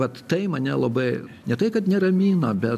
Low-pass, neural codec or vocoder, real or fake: 14.4 kHz; none; real